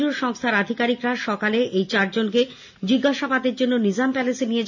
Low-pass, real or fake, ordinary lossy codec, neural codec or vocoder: 7.2 kHz; real; none; none